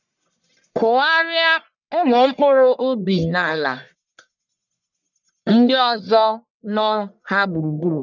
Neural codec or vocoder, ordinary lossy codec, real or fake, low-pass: codec, 44.1 kHz, 1.7 kbps, Pupu-Codec; none; fake; 7.2 kHz